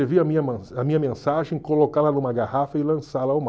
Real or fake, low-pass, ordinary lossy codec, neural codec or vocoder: real; none; none; none